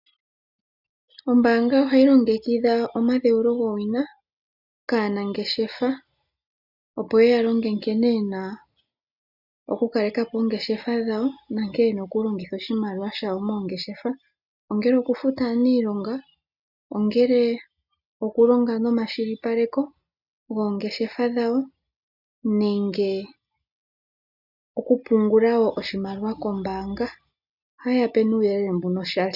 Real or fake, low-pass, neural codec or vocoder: real; 5.4 kHz; none